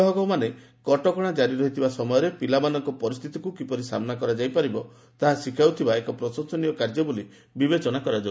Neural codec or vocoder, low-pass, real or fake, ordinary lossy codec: none; none; real; none